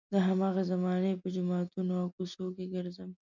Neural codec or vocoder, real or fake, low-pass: none; real; 7.2 kHz